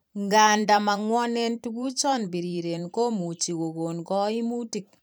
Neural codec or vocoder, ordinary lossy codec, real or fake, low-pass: vocoder, 44.1 kHz, 128 mel bands every 512 samples, BigVGAN v2; none; fake; none